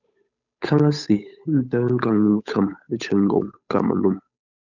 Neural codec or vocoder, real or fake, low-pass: codec, 16 kHz, 8 kbps, FunCodec, trained on Chinese and English, 25 frames a second; fake; 7.2 kHz